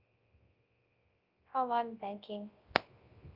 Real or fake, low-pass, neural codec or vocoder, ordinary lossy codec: fake; 5.4 kHz; codec, 24 kHz, 0.9 kbps, WavTokenizer, large speech release; Opus, 24 kbps